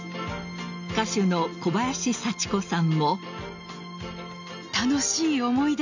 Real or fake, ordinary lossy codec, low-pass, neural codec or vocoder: real; none; 7.2 kHz; none